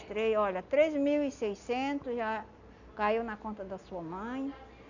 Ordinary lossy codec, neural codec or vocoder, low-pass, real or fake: none; none; 7.2 kHz; real